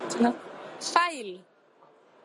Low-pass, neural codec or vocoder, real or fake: 10.8 kHz; none; real